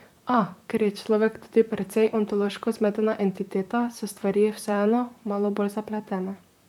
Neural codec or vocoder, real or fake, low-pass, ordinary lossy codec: vocoder, 44.1 kHz, 128 mel bands, Pupu-Vocoder; fake; 19.8 kHz; none